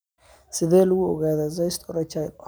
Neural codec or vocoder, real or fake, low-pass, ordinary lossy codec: none; real; none; none